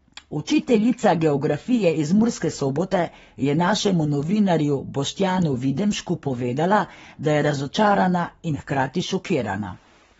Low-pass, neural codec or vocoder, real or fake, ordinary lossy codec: 19.8 kHz; codec, 44.1 kHz, 7.8 kbps, Pupu-Codec; fake; AAC, 24 kbps